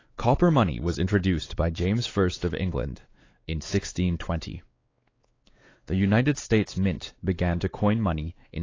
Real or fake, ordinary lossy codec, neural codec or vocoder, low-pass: fake; AAC, 32 kbps; codec, 16 kHz, 4 kbps, X-Codec, WavLM features, trained on Multilingual LibriSpeech; 7.2 kHz